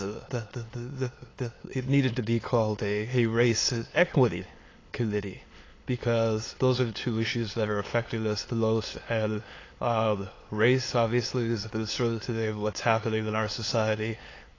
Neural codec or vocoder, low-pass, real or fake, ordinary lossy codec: autoencoder, 22.05 kHz, a latent of 192 numbers a frame, VITS, trained on many speakers; 7.2 kHz; fake; AAC, 32 kbps